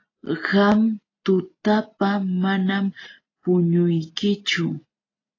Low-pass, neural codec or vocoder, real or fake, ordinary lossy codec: 7.2 kHz; none; real; AAC, 32 kbps